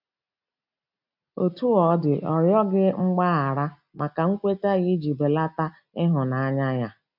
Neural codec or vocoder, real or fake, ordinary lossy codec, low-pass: none; real; none; 5.4 kHz